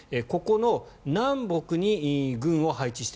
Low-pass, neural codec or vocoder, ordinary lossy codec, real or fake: none; none; none; real